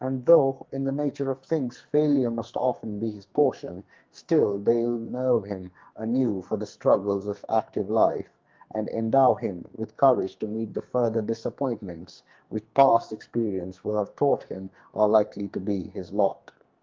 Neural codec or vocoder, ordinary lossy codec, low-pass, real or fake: codec, 44.1 kHz, 2.6 kbps, SNAC; Opus, 32 kbps; 7.2 kHz; fake